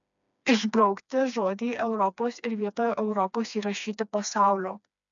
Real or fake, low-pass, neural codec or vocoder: fake; 7.2 kHz; codec, 16 kHz, 2 kbps, FreqCodec, smaller model